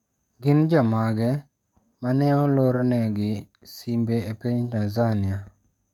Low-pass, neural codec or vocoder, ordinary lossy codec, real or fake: 19.8 kHz; codec, 44.1 kHz, 7.8 kbps, DAC; MP3, 96 kbps; fake